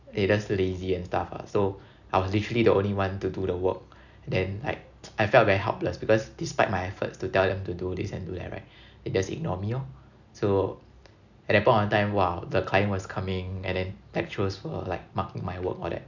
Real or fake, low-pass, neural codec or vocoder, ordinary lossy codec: real; 7.2 kHz; none; none